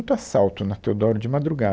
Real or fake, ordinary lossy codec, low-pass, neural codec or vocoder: real; none; none; none